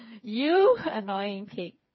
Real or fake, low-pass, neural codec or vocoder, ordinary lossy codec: fake; 7.2 kHz; codec, 16 kHz, 4 kbps, FreqCodec, smaller model; MP3, 24 kbps